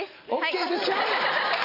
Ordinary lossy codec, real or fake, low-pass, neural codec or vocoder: none; real; 5.4 kHz; none